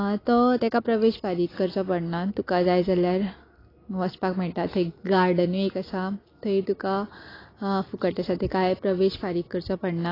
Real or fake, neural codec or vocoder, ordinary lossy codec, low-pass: real; none; AAC, 24 kbps; 5.4 kHz